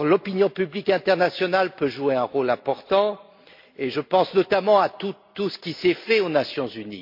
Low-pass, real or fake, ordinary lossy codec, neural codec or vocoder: 5.4 kHz; real; MP3, 32 kbps; none